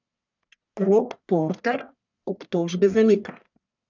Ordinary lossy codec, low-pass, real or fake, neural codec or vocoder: none; 7.2 kHz; fake; codec, 44.1 kHz, 1.7 kbps, Pupu-Codec